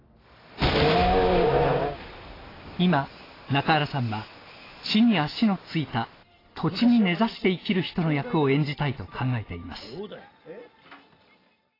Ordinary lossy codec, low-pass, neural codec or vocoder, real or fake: AAC, 24 kbps; 5.4 kHz; none; real